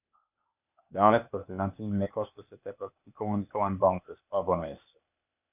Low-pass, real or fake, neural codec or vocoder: 3.6 kHz; fake; codec, 16 kHz, 0.8 kbps, ZipCodec